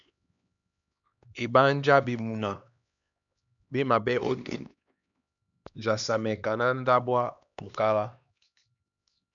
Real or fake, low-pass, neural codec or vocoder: fake; 7.2 kHz; codec, 16 kHz, 2 kbps, X-Codec, HuBERT features, trained on LibriSpeech